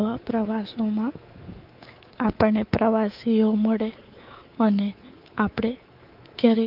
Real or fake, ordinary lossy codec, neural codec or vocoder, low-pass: fake; Opus, 32 kbps; codec, 24 kHz, 3.1 kbps, DualCodec; 5.4 kHz